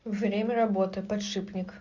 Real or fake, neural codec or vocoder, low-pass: real; none; 7.2 kHz